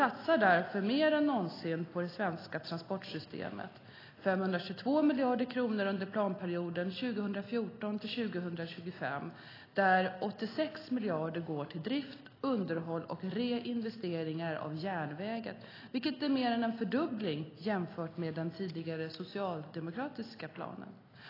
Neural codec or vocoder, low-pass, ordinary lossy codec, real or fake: none; 5.4 kHz; AAC, 24 kbps; real